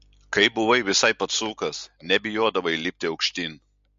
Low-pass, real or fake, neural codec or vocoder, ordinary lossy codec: 7.2 kHz; real; none; MP3, 48 kbps